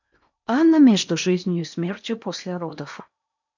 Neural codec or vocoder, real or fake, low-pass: codec, 16 kHz in and 24 kHz out, 0.8 kbps, FocalCodec, streaming, 65536 codes; fake; 7.2 kHz